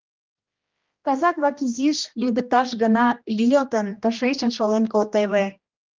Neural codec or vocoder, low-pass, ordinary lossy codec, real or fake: codec, 16 kHz, 1 kbps, X-Codec, HuBERT features, trained on general audio; 7.2 kHz; Opus, 24 kbps; fake